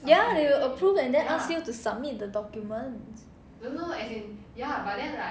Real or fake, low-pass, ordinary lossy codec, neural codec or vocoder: real; none; none; none